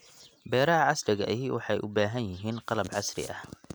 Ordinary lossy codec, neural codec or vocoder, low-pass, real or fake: none; none; none; real